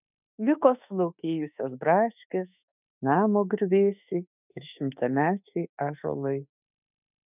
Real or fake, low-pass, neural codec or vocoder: fake; 3.6 kHz; autoencoder, 48 kHz, 32 numbers a frame, DAC-VAE, trained on Japanese speech